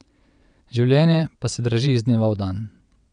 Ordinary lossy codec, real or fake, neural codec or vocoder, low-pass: none; fake; vocoder, 22.05 kHz, 80 mel bands, WaveNeXt; 9.9 kHz